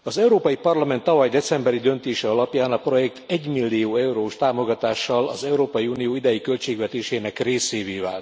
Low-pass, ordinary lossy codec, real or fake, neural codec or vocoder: none; none; real; none